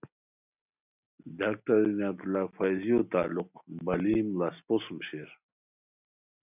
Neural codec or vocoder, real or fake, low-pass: none; real; 3.6 kHz